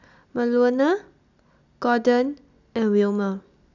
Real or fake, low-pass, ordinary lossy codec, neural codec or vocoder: real; 7.2 kHz; none; none